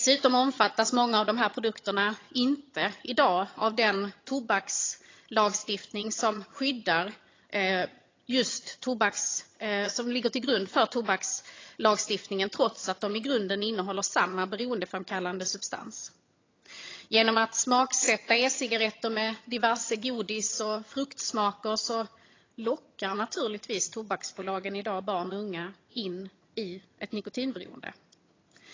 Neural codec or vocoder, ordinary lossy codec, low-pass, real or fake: vocoder, 22.05 kHz, 80 mel bands, HiFi-GAN; AAC, 32 kbps; 7.2 kHz; fake